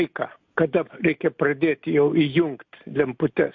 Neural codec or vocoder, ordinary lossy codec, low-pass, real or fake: none; MP3, 48 kbps; 7.2 kHz; real